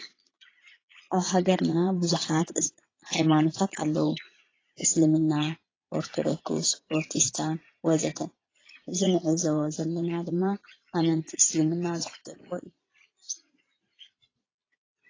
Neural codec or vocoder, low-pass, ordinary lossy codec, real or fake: vocoder, 22.05 kHz, 80 mel bands, Vocos; 7.2 kHz; AAC, 32 kbps; fake